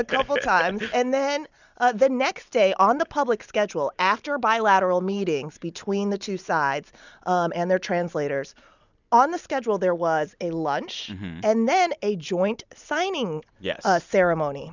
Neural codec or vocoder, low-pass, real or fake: none; 7.2 kHz; real